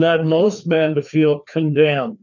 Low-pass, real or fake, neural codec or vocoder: 7.2 kHz; fake; codec, 16 kHz, 2 kbps, FreqCodec, larger model